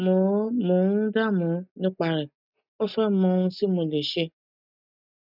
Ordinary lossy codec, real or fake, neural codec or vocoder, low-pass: none; real; none; 5.4 kHz